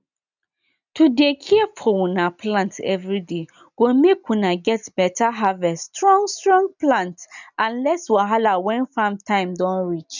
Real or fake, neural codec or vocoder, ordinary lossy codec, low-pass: real; none; none; 7.2 kHz